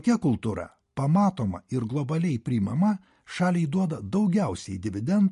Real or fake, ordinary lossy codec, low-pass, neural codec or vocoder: real; MP3, 48 kbps; 14.4 kHz; none